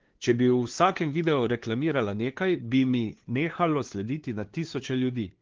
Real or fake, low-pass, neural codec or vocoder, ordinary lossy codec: fake; 7.2 kHz; codec, 16 kHz, 2 kbps, FunCodec, trained on LibriTTS, 25 frames a second; Opus, 16 kbps